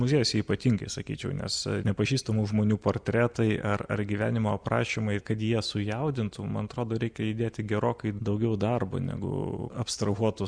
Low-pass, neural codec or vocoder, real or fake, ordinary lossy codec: 9.9 kHz; none; real; Opus, 64 kbps